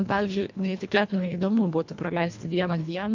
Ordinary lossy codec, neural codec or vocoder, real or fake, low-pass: MP3, 64 kbps; codec, 24 kHz, 1.5 kbps, HILCodec; fake; 7.2 kHz